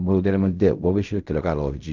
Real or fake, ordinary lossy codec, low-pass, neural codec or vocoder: fake; none; 7.2 kHz; codec, 16 kHz in and 24 kHz out, 0.4 kbps, LongCat-Audio-Codec, fine tuned four codebook decoder